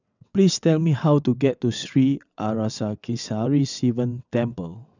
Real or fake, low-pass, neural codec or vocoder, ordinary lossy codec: fake; 7.2 kHz; vocoder, 22.05 kHz, 80 mel bands, WaveNeXt; none